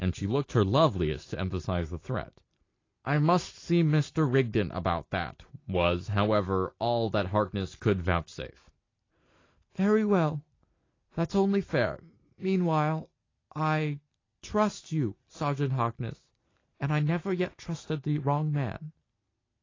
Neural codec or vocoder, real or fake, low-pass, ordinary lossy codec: none; real; 7.2 kHz; AAC, 32 kbps